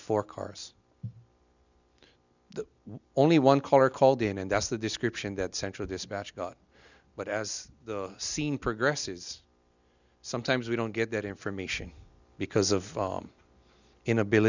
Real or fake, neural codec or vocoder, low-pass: real; none; 7.2 kHz